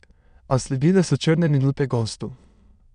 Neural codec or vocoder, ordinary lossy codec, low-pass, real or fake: autoencoder, 22.05 kHz, a latent of 192 numbers a frame, VITS, trained on many speakers; none; 9.9 kHz; fake